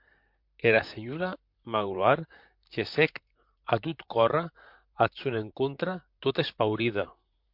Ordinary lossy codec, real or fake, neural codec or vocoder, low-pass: MP3, 48 kbps; fake; vocoder, 44.1 kHz, 128 mel bands, Pupu-Vocoder; 5.4 kHz